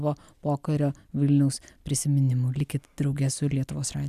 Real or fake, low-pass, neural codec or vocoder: real; 14.4 kHz; none